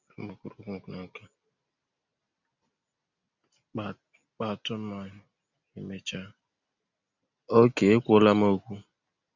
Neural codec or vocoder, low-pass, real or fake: none; 7.2 kHz; real